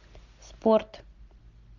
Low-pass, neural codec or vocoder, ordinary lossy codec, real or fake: 7.2 kHz; none; MP3, 64 kbps; real